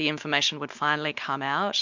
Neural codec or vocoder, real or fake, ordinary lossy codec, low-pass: none; real; MP3, 64 kbps; 7.2 kHz